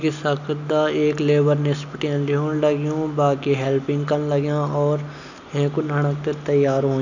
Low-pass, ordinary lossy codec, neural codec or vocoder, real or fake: 7.2 kHz; none; none; real